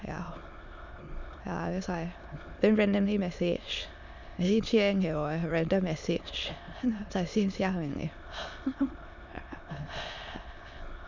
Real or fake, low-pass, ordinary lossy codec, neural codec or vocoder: fake; 7.2 kHz; none; autoencoder, 22.05 kHz, a latent of 192 numbers a frame, VITS, trained on many speakers